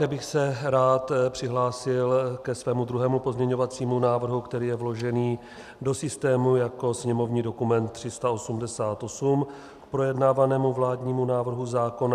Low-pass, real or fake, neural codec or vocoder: 14.4 kHz; real; none